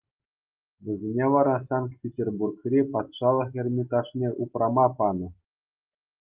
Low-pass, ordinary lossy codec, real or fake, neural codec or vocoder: 3.6 kHz; Opus, 32 kbps; real; none